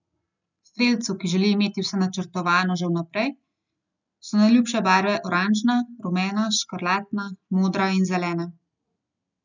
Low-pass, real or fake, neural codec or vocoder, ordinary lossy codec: 7.2 kHz; real; none; none